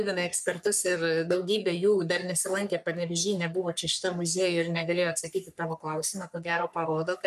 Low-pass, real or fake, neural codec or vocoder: 14.4 kHz; fake; codec, 44.1 kHz, 3.4 kbps, Pupu-Codec